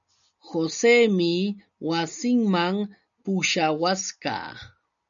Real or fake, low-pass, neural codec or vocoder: real; 7.2 kHz; none